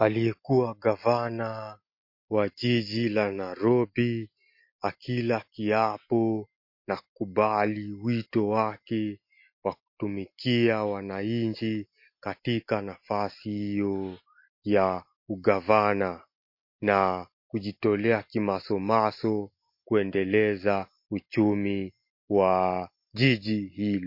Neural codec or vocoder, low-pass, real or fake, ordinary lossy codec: none; 5.4 kHz; real; MP3, 32 kbps